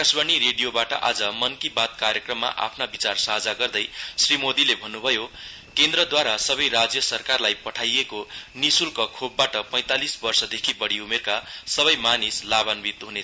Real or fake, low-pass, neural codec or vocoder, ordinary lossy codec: real; none; none; none